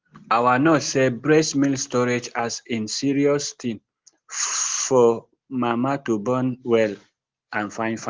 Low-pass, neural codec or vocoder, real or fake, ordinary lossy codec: 7.2 kHz; none; real; Opus, 16 kbps